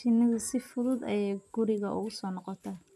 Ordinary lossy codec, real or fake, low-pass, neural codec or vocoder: none; real; 14.4 kHz; none